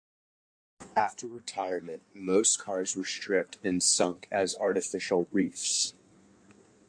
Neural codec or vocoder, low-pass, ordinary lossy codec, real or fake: codec, 16 kHz in and 24 kHz out, 1.1 kbps, FireRedTTS-2 codec; 9.9 kHz; AAC, 64 kbps; fake